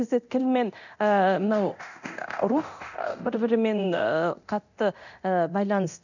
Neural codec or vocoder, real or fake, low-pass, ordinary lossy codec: codec, 24 kHz, 0.9 kbps, DualCodec; fake; 7.2 kHz; none